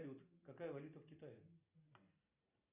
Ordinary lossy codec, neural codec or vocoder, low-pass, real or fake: Opus, 64 kbps; none; 3.6 kHz; real